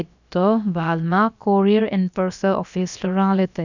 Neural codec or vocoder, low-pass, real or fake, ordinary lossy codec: codec, 16 kHz, about 1 kbps, DyCAST, with the encoder's durations; 7.2 kHz; fake; none